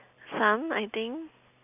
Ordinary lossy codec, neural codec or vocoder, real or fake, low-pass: none; none; real; 3.6 kHz